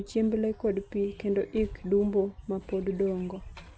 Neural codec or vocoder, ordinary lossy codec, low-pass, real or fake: none; none; none; real